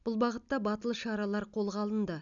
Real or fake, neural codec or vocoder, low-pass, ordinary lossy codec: real; none; 7.2 kHz; none